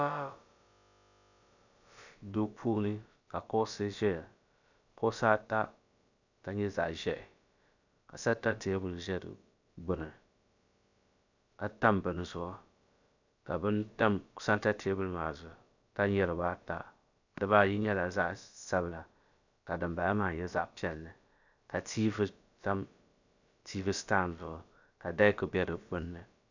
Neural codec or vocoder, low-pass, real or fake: codec, 16 kHz, about 1 kbps, DyCAST, with the encoder's durations; 7.2 kHz; fake